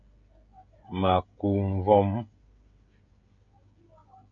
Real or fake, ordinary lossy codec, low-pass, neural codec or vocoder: fake; MP3, 48 kbps; 7.2 kHz; codec, 16 kHz, 16 kbps, FreqCodec, smaller model